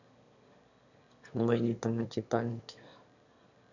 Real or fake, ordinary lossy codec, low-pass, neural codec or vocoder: fake; none; 7.2 kHz; autoencoder, 22.05 kHz, a latent of 192 numbers a frame, VITS, trained on one speaker